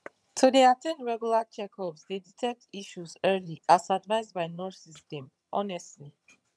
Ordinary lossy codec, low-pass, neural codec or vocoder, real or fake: none; none; vocoder, 22.05 kHz, 80 mel bands, HiFi-GAN; fake